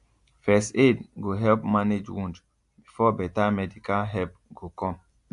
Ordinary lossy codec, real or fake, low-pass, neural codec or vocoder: AAC, 64 kbps; real; 10.8 kHz; none